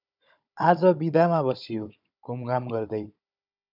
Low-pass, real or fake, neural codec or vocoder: 5.4 kHz; fake; codec, 16 kHz, 16 kbps, FunCodec, trained on Chinese and English, 50 frames a second